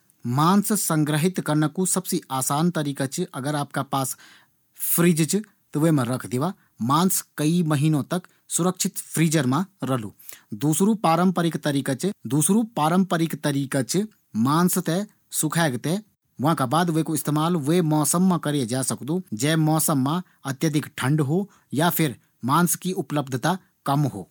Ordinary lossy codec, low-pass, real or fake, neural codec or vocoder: none; none; real; none